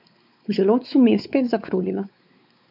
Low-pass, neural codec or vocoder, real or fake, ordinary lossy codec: 5.4 kHz; codec, 16 kHz, 4 kbps, X-Codec, WavLM features, trained on Multilingual LibriSpeech; fake; none